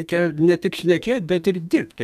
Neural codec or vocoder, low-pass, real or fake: codec, 32 kHz, 1.9 kbps, SNAC; 14.4 kHz; fake